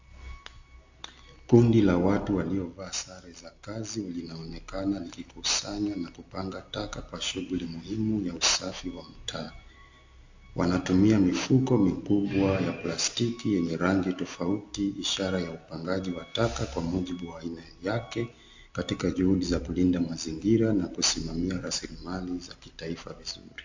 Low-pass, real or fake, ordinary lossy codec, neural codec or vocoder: 7.2 kHz; real; AAC, 48 kbps; none